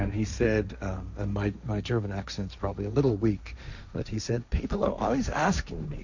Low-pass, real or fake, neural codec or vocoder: 7.2 kHz; fake; codec, 16 kHz, 1.1 kbps, Voila-Tokenizer